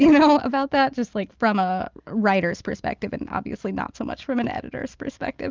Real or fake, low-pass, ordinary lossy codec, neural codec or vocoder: real; 7.2 kHz; Opus, 24 kbps; none